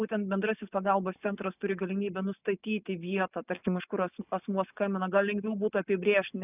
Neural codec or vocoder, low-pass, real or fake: none; 3.6 kHz; real